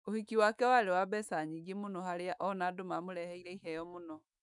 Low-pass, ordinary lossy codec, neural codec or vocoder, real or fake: 10.8 kHz; none; autoencoder, 48 kHz, 128 numbers a frame, DAC-VAE, trained on Japanese speech; fake